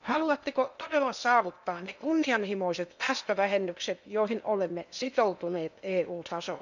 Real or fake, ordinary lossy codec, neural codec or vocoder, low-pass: fake; none; codec, 16 kHz in and 24 kHz out, 0.8 kbps, FocalCodec, streaming, 65536 codes; 7.2 kHz